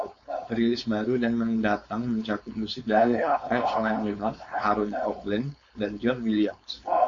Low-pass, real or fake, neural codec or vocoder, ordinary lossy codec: 7.2 kHz; fake; codec, 16 kHz, 4.8 kbps, FACodec; AAC, 48 kbps